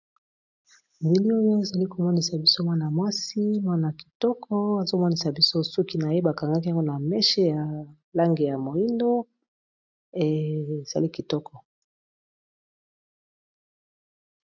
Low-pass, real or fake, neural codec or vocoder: 7.2 kHz; real; none